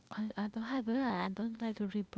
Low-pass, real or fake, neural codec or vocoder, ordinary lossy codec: none; fake; codec, 16 kHz, 0.8 kbps, ZipCodec; none